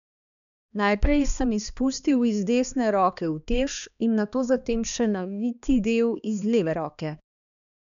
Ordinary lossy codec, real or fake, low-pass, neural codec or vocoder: none; fake; 7.2 kHz; codec, 16 kHz, 2 kbps, X-Codec, HuBERT features, trained on balanced general audio